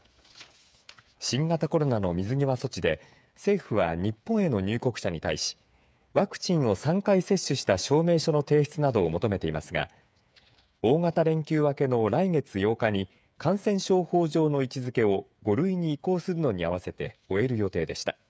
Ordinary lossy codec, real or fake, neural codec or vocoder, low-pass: none; fake; codec, 16 kHz, 8 kbps, FreqCodec, smaller model; none